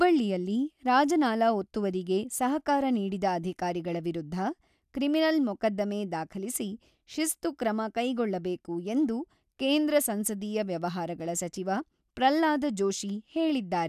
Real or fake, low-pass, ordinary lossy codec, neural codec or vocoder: real; 14.4 kHz; none; none